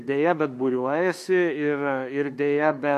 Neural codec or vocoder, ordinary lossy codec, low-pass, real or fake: autoencoder, 48 kHz, 32 numbers a frame, DAC-VAE, trained on Japanese speech; MP3, 64 kbps; 14.4 kHz; fake